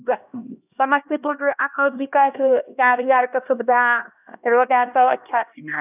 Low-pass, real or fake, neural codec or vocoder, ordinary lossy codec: 3.6 kHz; fake; codec, 16 kHz, 1 kbps, X-Codec, HuBERT features, trained on LibriSpeech; none